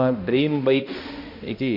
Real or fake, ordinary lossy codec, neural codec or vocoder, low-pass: fake; MP3, 32 kbps; codec, 16 kHz, 1 kbps, X-Codec, HuBERT features, trained on balanced general audio; 5.4 kHz